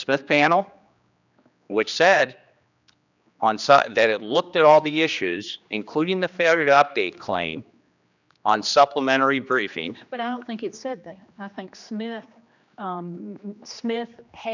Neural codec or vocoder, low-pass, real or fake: codec, 16 kHz, 2 kbps, X-Codec, HuBERT features, trained on general audio; 7.2 kHz; fake